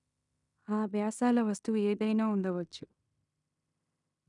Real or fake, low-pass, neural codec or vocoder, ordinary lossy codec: fake; 10.8 kHz; codec, 16 kHz in and 24 kHz out, 0.9 kbps, LongCat-Audio-Codec, fine tuned four codebook decoder; none